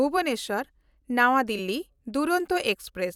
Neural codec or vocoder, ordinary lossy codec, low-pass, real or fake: vocoder, 44.1 kHz, 128 mel bands every 256 samples, BigVGAN v2; none; 19.8 kHz; fake